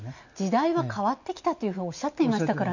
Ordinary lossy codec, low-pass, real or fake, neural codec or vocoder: none; 7.2 kHz; real; none